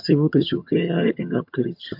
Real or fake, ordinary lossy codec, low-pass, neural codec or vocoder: fake; MP3, 48 kbps; 5.4 kHz; vocoder, 22.05 kHz, 80 mel bands, HiFi-GAN